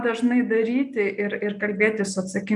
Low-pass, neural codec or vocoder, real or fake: 10.8 kHz; none; real